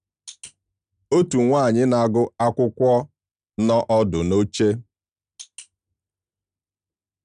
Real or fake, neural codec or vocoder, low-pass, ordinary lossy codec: real; none; 9.9 kHz; none